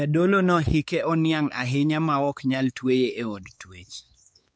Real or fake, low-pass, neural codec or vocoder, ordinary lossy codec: fake; none; codec, 16 kHz, 4 kbps, X-Codec, WavLM features, trained on Multilingual LibriSpeech; none